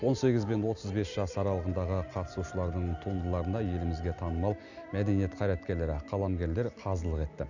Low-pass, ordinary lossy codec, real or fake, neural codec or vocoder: 7.2 kHz; none; real; none